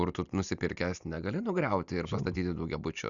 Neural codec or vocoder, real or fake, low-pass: none; real; 7.2 kHz